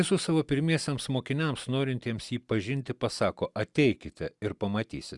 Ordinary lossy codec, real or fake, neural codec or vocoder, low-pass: Opus, 64 kbps; real; none; 10.8 kHz